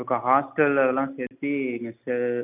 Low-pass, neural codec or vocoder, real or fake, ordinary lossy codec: 3.6 kHz; none; real; none